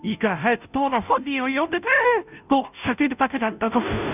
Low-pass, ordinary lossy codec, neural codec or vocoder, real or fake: 3.6 kHz; none; codec, 16 kHz, 0.5 kbps, FunCodec, trained on Chinese and English, 25 frames a second; fake